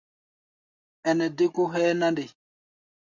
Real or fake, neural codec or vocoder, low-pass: real; none; 7.2 kHz